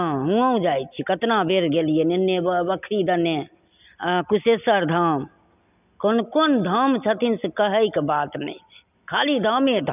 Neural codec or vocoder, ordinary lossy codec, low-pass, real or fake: none; none; 3.6 kHz; real